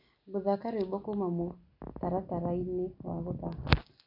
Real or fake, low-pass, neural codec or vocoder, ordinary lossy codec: real; 5.4 kHz; none; none